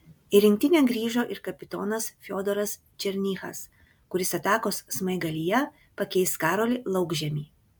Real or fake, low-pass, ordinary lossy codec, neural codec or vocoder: real; 19.8 kHz; MP3, 96 kbps; none